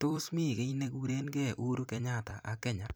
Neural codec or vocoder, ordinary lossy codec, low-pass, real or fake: vocoder, 44.1 kHz, 128 mel bands every 256 samples, BigVGAN v2; none; none; fake